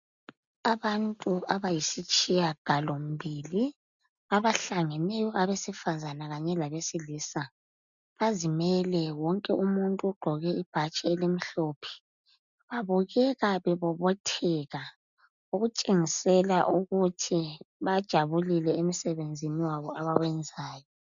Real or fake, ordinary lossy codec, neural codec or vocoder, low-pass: real; MP3, 96 kbps; none; 7.2 kHz